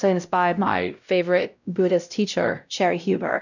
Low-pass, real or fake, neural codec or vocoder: 7.2 kHz; fake; codec, 16 kHz, 0.5 kbps, X-Codec, WavLM features, trained on Multilingual LibriSpeech